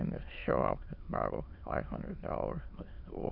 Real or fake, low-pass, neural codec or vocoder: fake; 5.4 kHz; autoencoder, 22.05 kHz, a latent of 192 numbers a frame, VITS, trained on many speakers